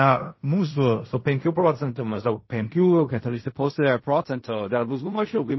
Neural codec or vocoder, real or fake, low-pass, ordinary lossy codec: codec, 16 kHz in and 24 kHz out, 0.4 kbps, LongCat-Audio-Codec, fine tuned four codebook decoder; fake; 7.2 kHz; MP3, 24 kbps